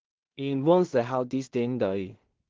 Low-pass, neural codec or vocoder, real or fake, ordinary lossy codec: 7.2 kHz; codec, 16 kHz in and 24 kHz out, 0.4 kbps, LongCat-Audio-Codec, two codebook decoder; fake; Opus, 16 kbps